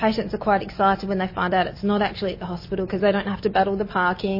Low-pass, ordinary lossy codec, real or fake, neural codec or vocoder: 5.4 kHz; MP3, 24 kbps; real; none